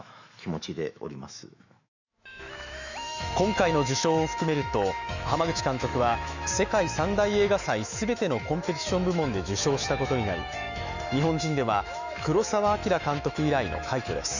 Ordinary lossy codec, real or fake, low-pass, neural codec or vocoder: none; fake; 7.2 kHz; autoencoder, 48 kHz, 128 numbers a frame, DAC-VAE, trained on Japanese speech